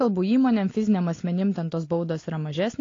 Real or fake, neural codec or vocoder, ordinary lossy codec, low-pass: real; none; AAC, 32 kbps; 7.2 kHz